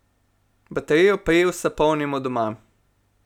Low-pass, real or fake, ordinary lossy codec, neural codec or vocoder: 19.8 kHz; real; none; none